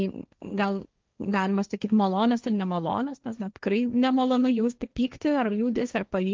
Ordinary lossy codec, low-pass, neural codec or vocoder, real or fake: Opus, 32 kbps; 7.2 kHz; codec, 16 kHz, 1.1 kbps, Voila-Tokenizer; fake